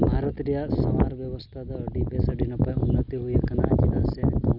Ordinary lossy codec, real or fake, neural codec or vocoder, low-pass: none; real; none; 5.4 kHz